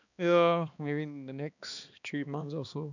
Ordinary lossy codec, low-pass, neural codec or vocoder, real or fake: none; 7.2 kHz; codec, 16 kHz, 2 kbps, X-Codec, HuBERT features, trained on balanced general audio; fake